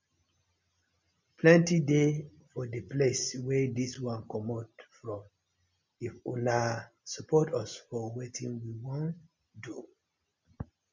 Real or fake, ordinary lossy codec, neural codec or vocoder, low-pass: real; MP3, 64 kbps; none; 7.2 kHz